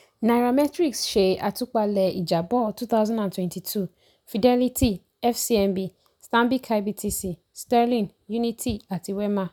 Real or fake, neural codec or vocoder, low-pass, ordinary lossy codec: real; none; none; none